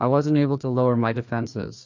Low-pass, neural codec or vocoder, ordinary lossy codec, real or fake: 7.2 kHz; codec, 16 kHz, 2 kbps, FreqCodec, larger model; MP3, 64 kbps; fake